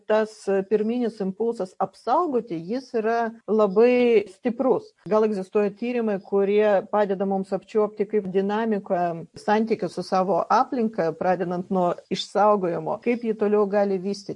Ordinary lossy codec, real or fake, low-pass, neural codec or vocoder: MP3, 48 kbps; real; 10.8 kHz; none